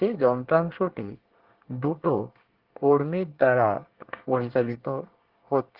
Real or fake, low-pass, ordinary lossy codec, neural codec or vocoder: fake; 5.4 kHz; Opus, 16 kbps; codec, 24 kHz, 1 kbps, SNAC